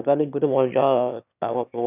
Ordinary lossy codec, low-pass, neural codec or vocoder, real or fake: none; 3.6 kHz; autoencoder, 22.05 kHz, a latent of 192 numbers a frame, VITS, trained on one speaker; fake